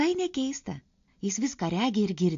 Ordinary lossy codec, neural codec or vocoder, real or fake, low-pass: AAC, 48 kbps; none; real; 7.2 kHz